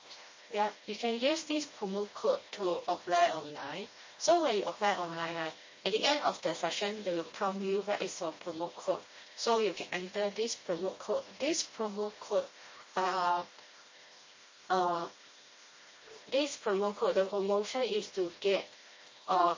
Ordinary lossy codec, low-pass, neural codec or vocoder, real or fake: MP3, 32 kbps; 7.2 kHz; codec, 16 kHz, 1 kbps, FreqCodec, smaller model; fake